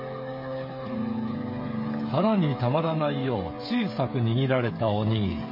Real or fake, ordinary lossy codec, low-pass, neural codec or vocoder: fake; MP3, 24 kbps; 5.4 kHz; codec, 16 kHz, 16 kbps, FreqCodec, smaller model